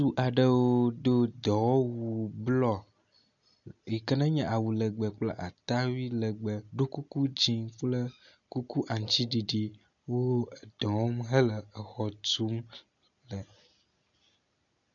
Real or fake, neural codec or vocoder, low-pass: real; none; 7.2 kHz